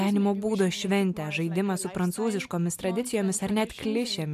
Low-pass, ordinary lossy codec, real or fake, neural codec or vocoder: 14.4 kHz; AAC, 96 kbps; real; none